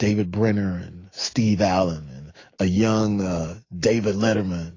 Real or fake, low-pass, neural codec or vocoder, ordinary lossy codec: fake; 7.2 kHz; autoencoder, 48 kHz, 128 numbers a frame, DAC-VAE, trained on Japanese speech; AAC, 32 kbps